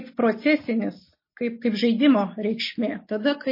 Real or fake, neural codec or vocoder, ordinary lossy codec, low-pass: real; none; MP3, 24 kbps; 5.4 kHz